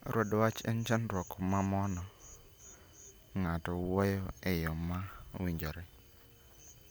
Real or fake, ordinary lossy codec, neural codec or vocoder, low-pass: real; none; none; none